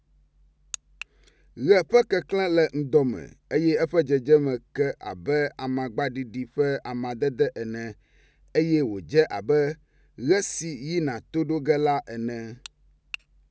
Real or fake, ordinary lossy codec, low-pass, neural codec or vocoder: real; none; none; none